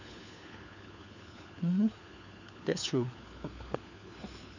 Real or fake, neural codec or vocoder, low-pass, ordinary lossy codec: fake; codec, 16 kHz, 4 kbps, FunCodec, trained on LibriTTS, 50 frames a second; 7.2 kHz; none